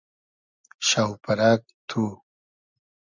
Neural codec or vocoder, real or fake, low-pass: none; real; 7.2 kHz